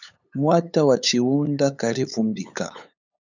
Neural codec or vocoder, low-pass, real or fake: codec, 16 kHz, 8 kbps, FunCodec, trained on LibriTTS, 25 frames a second; 7.2 kHz; fake